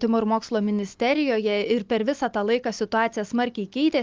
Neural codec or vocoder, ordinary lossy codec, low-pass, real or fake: none; Opus, 24 kbps; 7.2 kHz; real